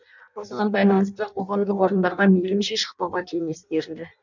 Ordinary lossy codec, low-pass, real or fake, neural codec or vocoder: none; 7.2 kHz; fake; codec, 16 kHz in and 24 kHz out, 0.6 kbps, FireRedTTS-2 codec